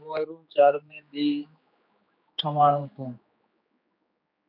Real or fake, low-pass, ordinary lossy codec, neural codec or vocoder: fake; 5.4 kHz; MP3, 48 kbps; codec, 16 kHz, 2 kbps, X-Codec, HuBERT features, trained on general audio